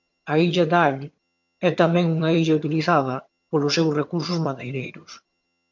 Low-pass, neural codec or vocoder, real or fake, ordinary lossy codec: 7.2 kHz; vocoder, 22.05 kHz, 80 mel bands, HiFi-GAN; fake; MP3, 64 kbps